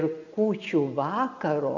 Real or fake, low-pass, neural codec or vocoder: real; 7.2 kHz; none